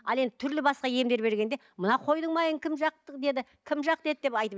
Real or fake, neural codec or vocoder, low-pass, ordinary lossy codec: real; none; none; none